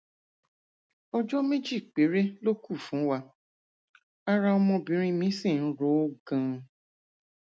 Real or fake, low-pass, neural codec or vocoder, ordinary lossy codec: real; none; none; none